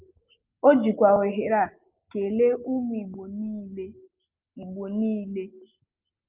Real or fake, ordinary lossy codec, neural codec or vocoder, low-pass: real; Opus, 24 kbps; none; 3.6 kHz